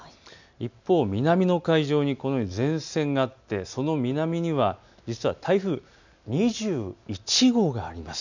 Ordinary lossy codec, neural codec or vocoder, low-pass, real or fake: none; none; 7.2 kHz; real